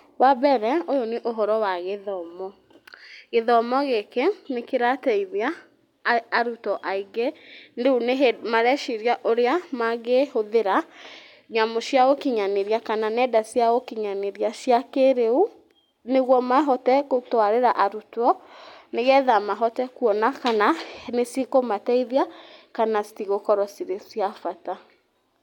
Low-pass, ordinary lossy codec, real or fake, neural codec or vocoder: 19.8 kHz; none; real; none